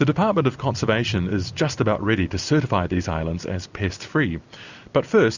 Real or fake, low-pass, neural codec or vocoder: real; 7.2 kHz; none